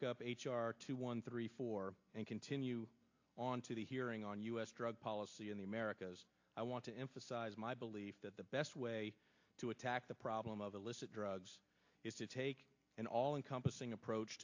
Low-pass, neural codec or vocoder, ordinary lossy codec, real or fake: 7.2 kHz; none; AAC, 48 kbps; real